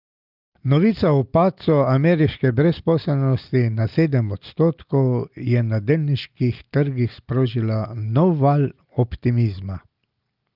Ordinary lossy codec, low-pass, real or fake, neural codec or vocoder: Opus, 32 kbps; 5.4 kHz; real; none